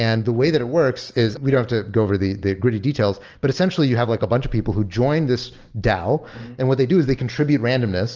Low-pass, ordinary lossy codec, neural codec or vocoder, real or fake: 7.2 kHz; Opus, 24 kbps; none; real